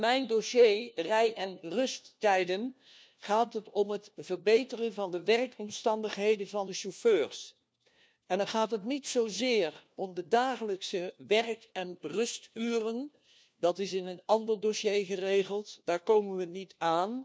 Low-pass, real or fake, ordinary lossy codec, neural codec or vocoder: none; fake; none; codec, 16 kHz, 1 kbps, FunCodec, trained on LibriTTS, 50 frames a second